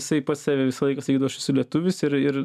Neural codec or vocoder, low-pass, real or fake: none; 14.4 kHz; real